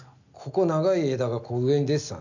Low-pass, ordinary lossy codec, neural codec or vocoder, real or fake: 7.2 kHz; none; none; real